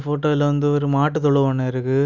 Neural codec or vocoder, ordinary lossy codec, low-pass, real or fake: none; none; 7.2 kHz; real